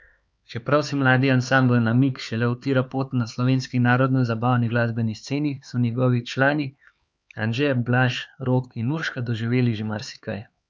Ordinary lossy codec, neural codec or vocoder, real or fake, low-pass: none; codec, 16 kHz, 4 kbps, X-Codec, HuBERT features, trained on LibriSpeech; fake; none